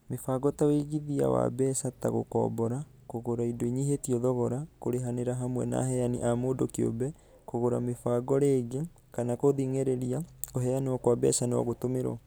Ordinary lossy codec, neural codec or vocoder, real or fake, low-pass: none; none; real; none